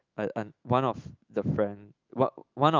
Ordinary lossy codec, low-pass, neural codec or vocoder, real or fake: Opus, 32 kbps; 7.2 kHz; autoencoder, 48 kHz, 128 numbers a frame, DAC-VAE, trained on Japanese speech; fake